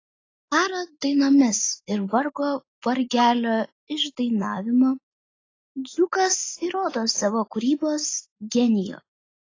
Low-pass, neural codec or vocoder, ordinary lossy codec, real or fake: 7.2 kHz; none; AAC, 32 kbps; real